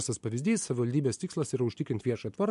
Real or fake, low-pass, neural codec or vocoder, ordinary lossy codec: real; 10.8 kHz; none; MP3, 64 kbps